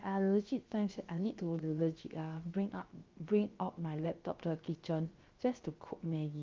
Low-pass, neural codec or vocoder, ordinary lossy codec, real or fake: 7.2 kHz; codec, 16 kHz, 0.7 kbps, FocalCodec; Opus, 24 kbps; fake